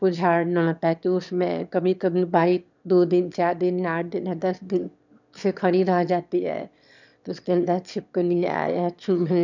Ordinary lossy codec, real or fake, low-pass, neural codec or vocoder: none; fake; 7.2 kHz; autoencoder, 22.05 kHz, a latent of 192 numbers a frame, VITS, trained on one speaker